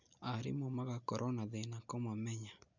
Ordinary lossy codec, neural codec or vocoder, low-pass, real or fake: none; none; 7.2 kHz; real